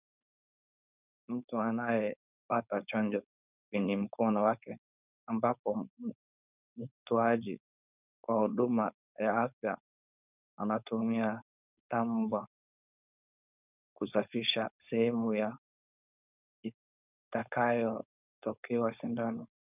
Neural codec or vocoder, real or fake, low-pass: codec, 16 kHz, 4.8 kbps, FACodec; fake; 3.6 kHz